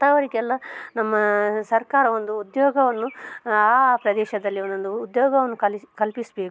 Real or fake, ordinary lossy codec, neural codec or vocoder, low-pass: real; none; none; none